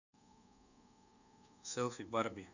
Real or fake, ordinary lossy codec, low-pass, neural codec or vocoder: fake; none; 7.2 kHz; codec, 24 kHz, 1.2 kbps, DualCodec